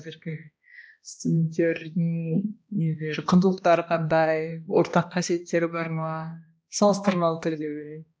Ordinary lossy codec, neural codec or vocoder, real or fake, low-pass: none; codec, 16 kHz, 1 kbps, X-Codec, HuBERT features, trained on balanced general audio; fake; none